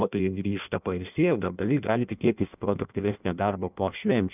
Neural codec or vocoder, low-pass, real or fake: codec, 16 kHz in and 24 kHz out, 0.6 kbps, FireRedTTS-2 codec; 3.6 kHz; fake